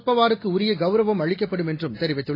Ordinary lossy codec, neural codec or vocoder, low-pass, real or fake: AAC, 24 kbps; none; 5.4 kHz; real